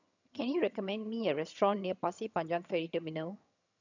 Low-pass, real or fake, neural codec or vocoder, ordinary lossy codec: 7.2 kHz; fake; vocoder, 22.05 kHz, 80 mel bands, HiFi-GAN; none